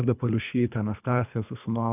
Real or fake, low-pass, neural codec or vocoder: fake; 3.6 kHz; codec, 24 kHz, 3 kbps, HILCodec